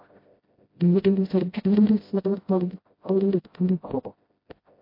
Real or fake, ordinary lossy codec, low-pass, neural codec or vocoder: fake; MP3, 32 kbps; 5.4 kHz; codec, 16 kHz, 0.5 kbps, FreqCodec, smaller model